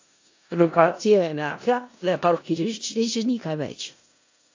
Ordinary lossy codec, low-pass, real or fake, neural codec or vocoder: AAC, 48 kbps; 7.2 kHz; fake; codec, 16 kHz in and 24 kHz out, 0.4 kbps, LongCat-Audio-Codec, four codebook decoder